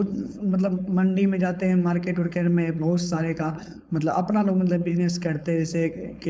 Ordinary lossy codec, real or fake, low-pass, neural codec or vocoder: none; fake; none; codec, 16 kHz, 4.8 kbps, FACodec